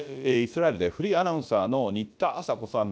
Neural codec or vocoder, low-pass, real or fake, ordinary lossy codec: codec, 16 kHz, about 1 kbps, DyCAST, with the encoder's durations; none; fake; none